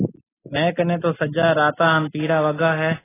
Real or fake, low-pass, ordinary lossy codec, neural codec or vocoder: real; 3.6 kHz; AAC, 16 kbps; none